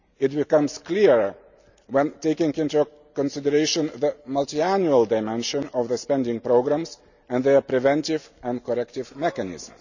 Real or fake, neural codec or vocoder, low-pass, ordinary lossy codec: real; none; 7.2 kHz; none